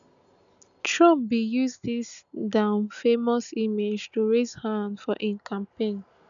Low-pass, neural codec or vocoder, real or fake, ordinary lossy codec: 7.2 kHz; none; real; none